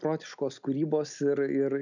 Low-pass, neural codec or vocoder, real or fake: 7.2 kHz; none; real